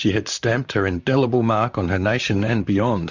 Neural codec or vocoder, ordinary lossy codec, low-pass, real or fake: vocoder, 44.1 kHz, 128 mel bands, Pupu-Vocoder; Opus, 64 kbps; 7.2 kHz; fake